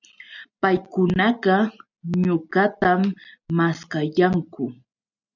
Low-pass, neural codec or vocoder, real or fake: 7.2 kHz; none; real